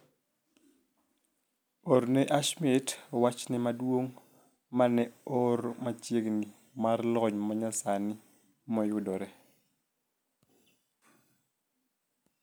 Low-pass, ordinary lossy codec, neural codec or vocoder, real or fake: none; none; none; real